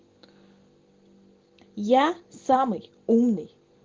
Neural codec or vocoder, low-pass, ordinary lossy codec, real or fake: none; 7.2 kHz; Opus, 16 kbps; real